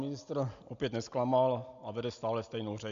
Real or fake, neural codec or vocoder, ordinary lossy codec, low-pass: real; none; MP3, 64 kbps; 7.2 kHz